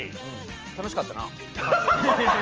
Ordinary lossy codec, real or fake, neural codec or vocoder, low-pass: Opus, 24 kbps; real; none; 7.2 kHz